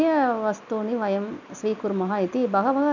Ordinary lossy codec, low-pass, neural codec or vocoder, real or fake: none; 7.2 kHz; none; real